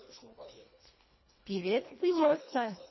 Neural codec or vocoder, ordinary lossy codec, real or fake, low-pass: codec, 24 kHz, 1.5 kbps, HILCodec; MP3, 24 kbps; fake; 7.2 kHz